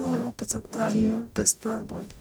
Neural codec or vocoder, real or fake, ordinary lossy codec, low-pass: codec, 44.1 kHz, 0.9 kbps, DAC; fake; none; none